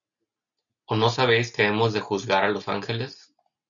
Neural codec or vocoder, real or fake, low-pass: none; real; 7.2 kHz